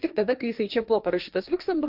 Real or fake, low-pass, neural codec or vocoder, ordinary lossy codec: fake; 5.4 kHz; codec, 16 kHz, 1.1 kbps, Voila-Tokenizer; AAC, 48 kbps